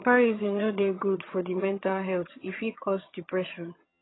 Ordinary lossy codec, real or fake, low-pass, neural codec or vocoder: AAC, 16 kbps; fake; 7.2 kHz; vocoder, 22.05 kHz, 80 mel bands, HiFi-GAN